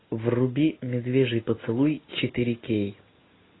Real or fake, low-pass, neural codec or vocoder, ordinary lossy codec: real; 7.2 kHz; none; AAC, 16 kbps